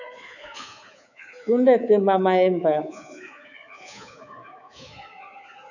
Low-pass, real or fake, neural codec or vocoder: 7.2 kHz; fake; codec, 24 kHz, 3.1 kbps, DualCodec